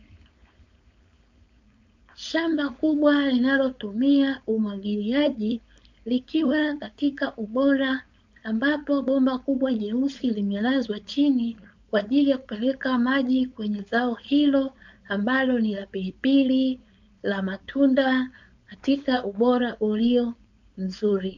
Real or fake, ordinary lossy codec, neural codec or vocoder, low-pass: fake; MP3, 64 kbps; codec, 16 kHz, 4.8 kbps, FACodec; 7.2 kHz